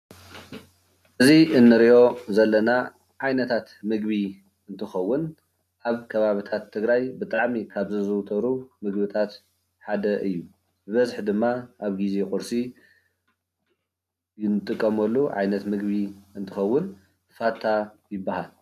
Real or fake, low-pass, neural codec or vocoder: real; 14.4 kHz; none